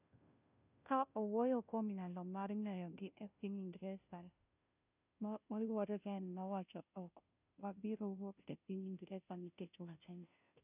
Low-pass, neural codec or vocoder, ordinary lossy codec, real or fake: 3.6 kHz; codec, 16 kHz, 0.5 kbps, FunCodec, trained on Chinese and English, 25 frames a second; none; fake